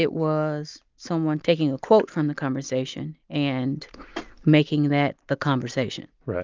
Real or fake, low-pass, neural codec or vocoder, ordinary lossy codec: real; 7.2 kHz; none; Opus, 24 kbps